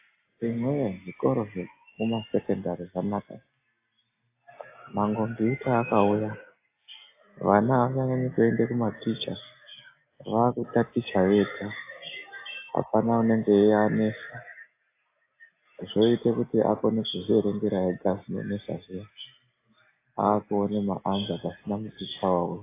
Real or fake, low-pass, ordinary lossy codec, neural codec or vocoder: real; 3.6 kHz; AAC, 24 kbps; none